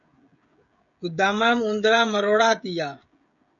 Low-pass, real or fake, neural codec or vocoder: 7.2 kHz; fake; codec, 16 kHz, 16 kbps, FreqCodec, smaller model